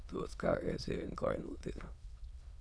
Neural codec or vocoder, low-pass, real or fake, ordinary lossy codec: autoencoder, 22.05 kHz, a latent of 192 numbers a frame, VITS, trained on many speakers; none; fake; none